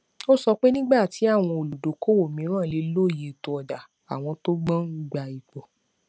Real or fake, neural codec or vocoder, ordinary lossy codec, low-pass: real; none; none; none